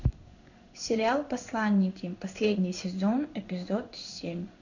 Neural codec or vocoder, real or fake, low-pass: codec, 16 kHz in and 24 kHz out, 1 kbps, XY-Tokenizer; fake; 7.2 kHz